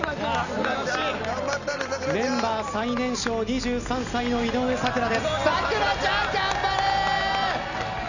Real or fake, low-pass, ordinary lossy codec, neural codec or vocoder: real; 7.2 kHz; none; none